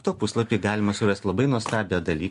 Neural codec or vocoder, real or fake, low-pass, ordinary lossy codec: none; real; 10.8 kHz; MP3, 64 kbps